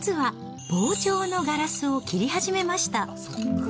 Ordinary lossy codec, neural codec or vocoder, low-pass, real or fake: none; none; none; real